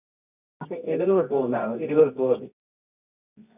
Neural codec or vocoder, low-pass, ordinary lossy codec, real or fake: codec, 24 kHz, 0.9 kbps, WavTokenizer, medium music audio release; 3.6 kHz; none; fake